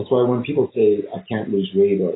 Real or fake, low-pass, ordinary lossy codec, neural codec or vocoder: real; 7.2 kHz; AAC, 16 kbps; none